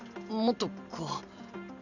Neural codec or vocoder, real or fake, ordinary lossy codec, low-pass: none; real; none; 7.2 kHz